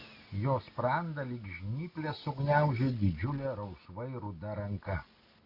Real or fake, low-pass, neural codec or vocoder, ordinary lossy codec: real; 5.4 kHz; none; AAC, 32 kbps